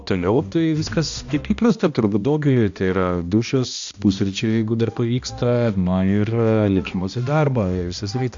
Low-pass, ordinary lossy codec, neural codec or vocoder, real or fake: 7.2 kHz; AAC, 64 kbps; codec, 16 kHz, 1 kbps, X-Codec, HuBERT features, trained on balanced general audio; fake